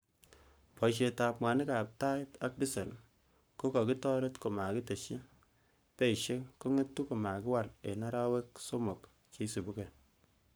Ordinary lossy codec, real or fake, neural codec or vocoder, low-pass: none; fake; codec, 44.1 kHz, 7.8 kbps, Pupu-Codec; none